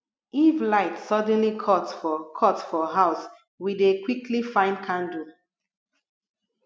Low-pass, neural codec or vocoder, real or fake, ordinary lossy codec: none; none; real; none